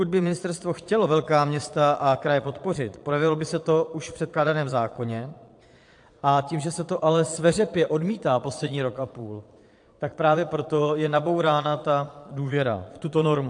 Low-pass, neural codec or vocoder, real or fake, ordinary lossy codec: 9.9 kHz; vocoder, 22.05 kHz, 80 mel bands, Vocos; fake; AAC, 64 kbps